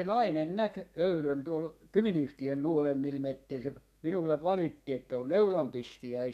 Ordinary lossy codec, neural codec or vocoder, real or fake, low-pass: none; codec, 32 kHz, 1.9 kbps, SNAC; fake; 14.4 kHz